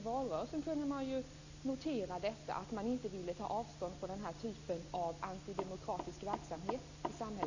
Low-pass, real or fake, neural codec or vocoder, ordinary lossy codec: 7.2 kHz; real; none; Opus, 64 kbps